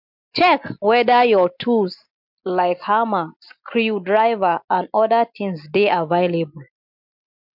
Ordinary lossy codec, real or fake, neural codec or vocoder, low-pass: MP3, 48 kbps; real; none; 5.4 kHz